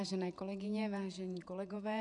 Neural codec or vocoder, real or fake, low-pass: vocoder, 48 kHz, 128 mel bands, Vocos; fake; 10.8 kHz